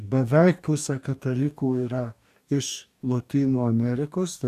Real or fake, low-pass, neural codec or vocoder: fake; 14.4 kHz; codec, 44.1 kHz, 2.6 kbps, DAC